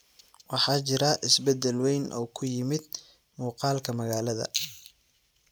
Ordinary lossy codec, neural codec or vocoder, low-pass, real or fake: none; none; none; real